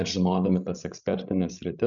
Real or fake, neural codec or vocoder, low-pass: fake; codec, 16 kHz, 16 kbps, FreqCodec, larger model; 7.2 kHz